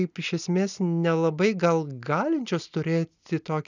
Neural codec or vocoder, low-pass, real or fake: none; 7.2 kHz; real